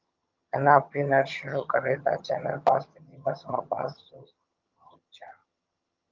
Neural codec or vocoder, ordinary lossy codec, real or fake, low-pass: vocoder, 22.05 kHz, 80 mel bands, HiFi-GAN; Opus, 24 kbps; fake; 7.2 kHz